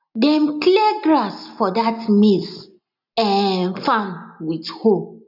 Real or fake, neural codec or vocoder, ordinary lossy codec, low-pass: real; none; none; 5.4 kHz